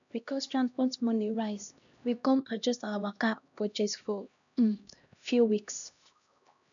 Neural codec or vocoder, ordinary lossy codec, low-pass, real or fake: codec, 16 kHz, 1 kbps, X-Codec, HuBERT features, trained on LibriSpeech; none; 7.2 kHz; fake